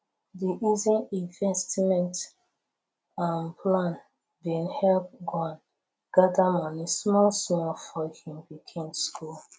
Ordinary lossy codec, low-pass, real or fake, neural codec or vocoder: none; none; real; none